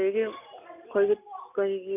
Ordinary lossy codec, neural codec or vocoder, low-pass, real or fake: none; none; 3.6 kHz; real